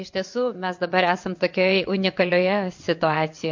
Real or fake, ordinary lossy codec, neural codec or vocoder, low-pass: fake; MP3, 48 kbps; vocoder, 22.05 kHz, 80 mel bands, WaveNeXt; 7.2 kHz